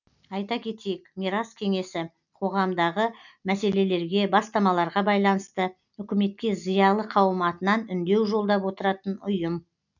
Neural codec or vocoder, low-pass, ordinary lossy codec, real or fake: none; 7.2 kHz; none; real